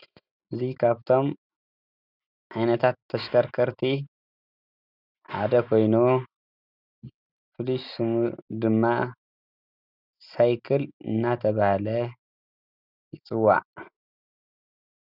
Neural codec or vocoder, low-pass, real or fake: none; 5.4 kHz; real